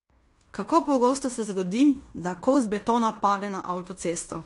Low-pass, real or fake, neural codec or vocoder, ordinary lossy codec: 10.8 kHz; fake; codec, 16 kHz in and 24 kHz out, 0.9 kbps, LongCat-Audio-Codec, fine tuned four codebook decoder; AAC, 48 kbps